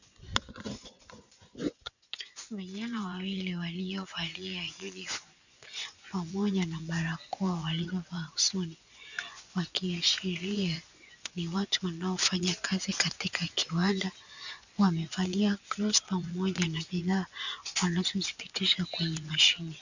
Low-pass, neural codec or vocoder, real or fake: 7.2 kHz; vocoder, 24 kHz, 100 mel bands, Vocos; fake